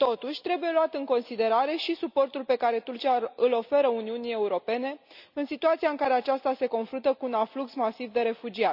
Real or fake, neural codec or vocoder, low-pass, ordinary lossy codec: real; none; 5.4 kHz; none